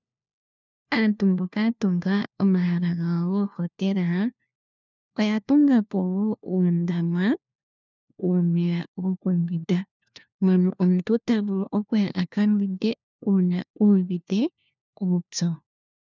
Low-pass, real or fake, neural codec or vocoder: 7.2 kHz; fake; codec, 16 kHz, 1 kbps, FunCodec, trained on LibriTTS, 50 frames a second